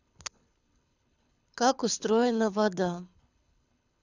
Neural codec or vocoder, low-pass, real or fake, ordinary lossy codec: codec, 24 kHz, 6 kbps, HILCodec; 7.2 kHz; fake; none